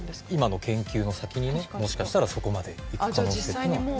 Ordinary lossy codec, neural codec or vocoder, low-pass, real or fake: none; none; none; real